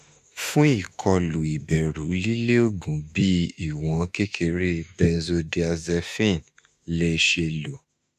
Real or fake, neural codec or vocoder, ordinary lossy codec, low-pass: fake; autoencoder, 48 kHz, 32 numbers a frame, DAC-VAE, trained on Japanese speech; none; 14.4 kHz